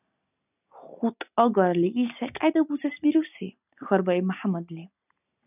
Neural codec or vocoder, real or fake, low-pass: none; real; 3.6 kHz